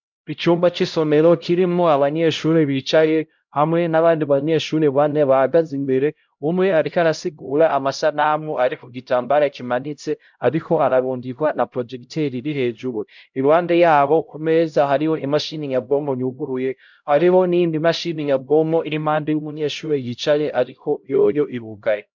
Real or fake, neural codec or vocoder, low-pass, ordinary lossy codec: fake; codec, 16 kHz, 0.5 kbps, X-Codec, HuBERT features, trained on LibriSpeech; 7.2 kHz; MP3, 64 kbps